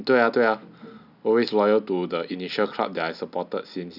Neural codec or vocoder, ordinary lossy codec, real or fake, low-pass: none; none; real; 5.4 kHz